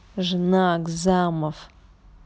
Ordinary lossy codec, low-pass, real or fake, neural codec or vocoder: none; none; real; none